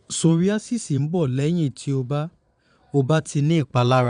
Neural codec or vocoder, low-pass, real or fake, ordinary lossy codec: vocoder, 22.05 kHz, 80 mel bands, Vocos; 9.9 kHz; fake; none